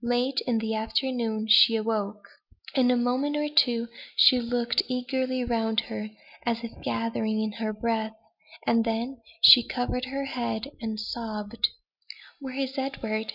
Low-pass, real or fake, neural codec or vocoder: 5.4 kHz; real; none